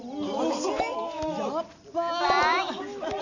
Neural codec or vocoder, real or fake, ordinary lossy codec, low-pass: none; real; none; 7.2 kHz